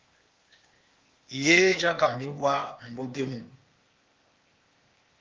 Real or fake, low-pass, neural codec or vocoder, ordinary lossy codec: fake; 7.2 kHz; codec, 16 kHz, 0.8 kbps, ZipCodec; Opus, 32 kbps